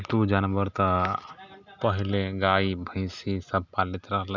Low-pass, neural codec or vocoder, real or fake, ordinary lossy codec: 7.2 kHz; none; real; none